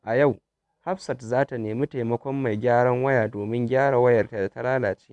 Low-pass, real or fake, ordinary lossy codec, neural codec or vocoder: 10.8 kHz; real; Opus, 64 kbps; none